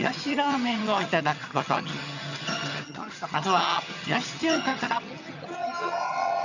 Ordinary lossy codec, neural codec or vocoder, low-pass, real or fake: none; vocoder, 22.05 kHz, 80 mel bands, HiFi-GAN; 7.2 kHz; fake